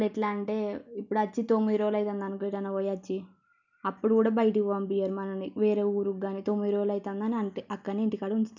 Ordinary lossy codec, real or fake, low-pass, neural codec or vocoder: none; real; 7.2 kHz; none